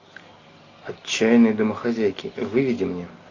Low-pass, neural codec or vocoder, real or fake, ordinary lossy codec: 7.2 kHz; none; real; AAC, 32 kbps